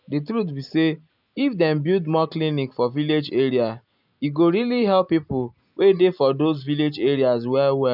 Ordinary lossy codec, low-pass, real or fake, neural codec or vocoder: none; 5.4 kHz; real; none